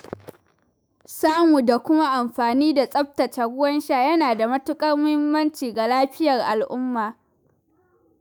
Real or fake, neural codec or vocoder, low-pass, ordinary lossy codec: fake; autoencoder, 48 kHz, 128 numbers a frame, DAC-VAE, trained on Japanese speech; none; none